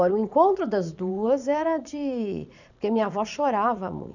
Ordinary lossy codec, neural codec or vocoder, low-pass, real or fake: none; vocoder, 44.1 kHz, 80 mel bands, Vocos; 7.2 kHz; fake